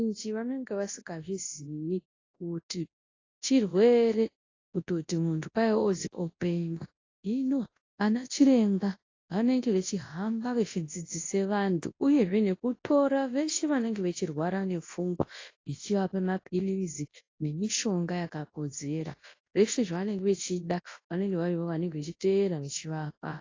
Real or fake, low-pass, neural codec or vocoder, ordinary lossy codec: fake; 7.2 kHz; codec, 24 kHz, 0.9 kbps, WavTokenizer, large speech release; AAC, 32 kbps